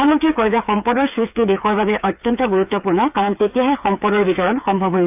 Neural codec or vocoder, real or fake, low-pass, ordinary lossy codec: codec, 16 kHz, 8 kbps, FreqCodec, smaller model; fake; 3.6 kHz; AAC, 32 kbps